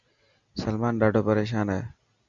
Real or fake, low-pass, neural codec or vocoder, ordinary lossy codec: real; 7.2 kHz; none; Opus, 64 kbps